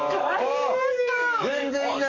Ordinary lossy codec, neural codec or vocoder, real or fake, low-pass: MP3, 48 kbps; codec, 44.1 kHz, 7.8 kbps, DAC; fake; 7.2 kHz